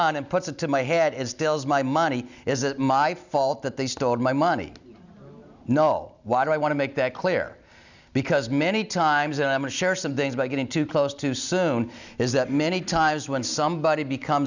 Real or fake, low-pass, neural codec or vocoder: real; 7.2 kHz; none